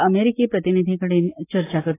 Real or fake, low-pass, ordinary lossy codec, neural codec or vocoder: real; 3.6 kHz; AAC, 16 kbps; none